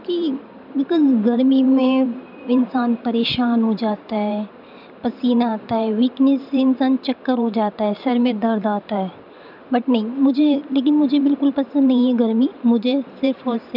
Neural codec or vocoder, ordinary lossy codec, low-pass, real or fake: vocoder, 44.1 kHz, 128 mel bands every 512 samples, BigVGAN v2; none; 5.4 kHz; fake